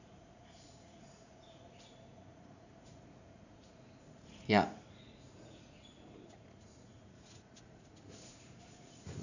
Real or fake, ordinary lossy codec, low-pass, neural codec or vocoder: fake; none; 7.2 kHz; vocoder, 44.1 kHz, 80 mel bands, Vocos